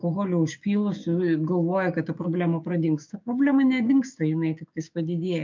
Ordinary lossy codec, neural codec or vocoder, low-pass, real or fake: MP3, 64 kbps; codec, 16 kHz, 6 kbps, DAC; 7.2 kHz; fake